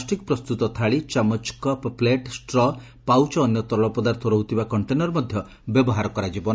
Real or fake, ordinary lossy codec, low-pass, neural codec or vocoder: real; none; none; none